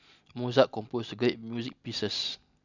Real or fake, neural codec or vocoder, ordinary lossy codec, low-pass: real; none; none; 7.2 kHz